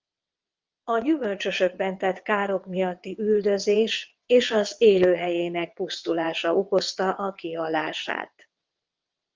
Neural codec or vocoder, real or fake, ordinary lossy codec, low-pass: vocoder, 22.05 kHz, 80 mel bands, WaveNeXt; fake; Opus, 24 kbps; 7.2 kHz